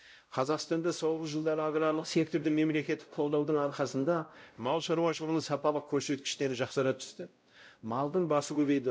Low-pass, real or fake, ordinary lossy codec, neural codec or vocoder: none; fake; none; codec, 16 kHz, 0.5 kbps, X-Codec, WavLM features, trained on Multilingual LibriSpeech